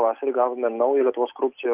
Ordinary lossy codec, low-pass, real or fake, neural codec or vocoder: Opus, 24 kbps; 3.6 kHz; real; none